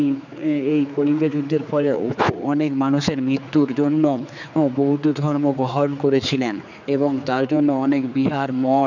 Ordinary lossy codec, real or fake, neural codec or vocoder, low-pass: none; fake; codec, 16 kHz, 4 kbps, X-Codec, HuBERT features, trained on general audio; 7.2 kHz